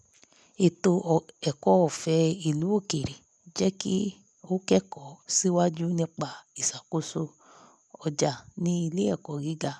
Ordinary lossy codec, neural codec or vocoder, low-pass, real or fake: none; none; none; real